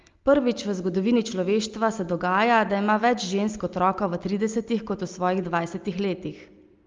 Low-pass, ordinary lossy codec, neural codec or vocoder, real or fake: 7.2 kHz; Opus, 32 kbps; none; real